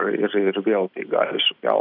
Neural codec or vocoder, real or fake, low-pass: none; real; 5.4 kHz